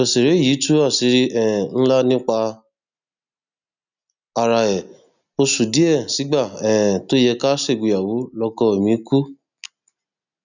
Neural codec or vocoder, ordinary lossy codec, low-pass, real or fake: none; none; 7.2 kHz; real